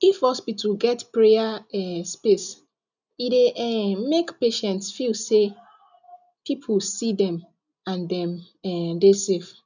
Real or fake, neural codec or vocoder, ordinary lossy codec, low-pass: real; none; none; 7.2 kHz